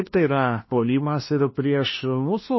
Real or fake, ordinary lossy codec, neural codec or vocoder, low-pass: fake; MP3, 24 kbps; codec, 16 kHz, 1 kbps, FunCodec, trained on LibriTTS, 50 frames a second; 7.2 kHz